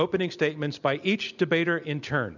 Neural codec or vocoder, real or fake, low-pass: none; real; 7.2 kHz